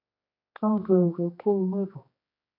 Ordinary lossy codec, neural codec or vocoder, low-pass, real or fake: AAC, 32 kbps; codec, 16 kHz, 2 kbps, X-Codec, HuBERT features, trained on general audio; 5.4 kHz; fake